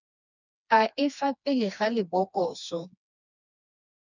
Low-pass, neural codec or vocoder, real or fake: 7.2 kHz; codec, 16 kHz, 2 kbps, FreqCodec, smaller model; fake